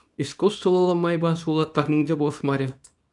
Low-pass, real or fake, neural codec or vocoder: 10.8 kHz; fake; codec, 24 kHz, 0.9 kbps, WavTokenizer, small release